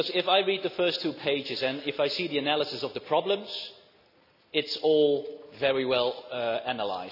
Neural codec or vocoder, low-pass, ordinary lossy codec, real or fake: none; 5.4 kHz; none; real